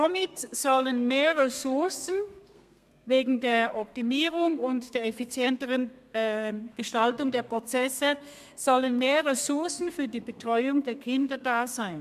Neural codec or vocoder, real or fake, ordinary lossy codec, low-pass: codec, 32 kHz, 1.9 kbps, SNAC; fake; MP3, 96 kbps; 14.4 kHz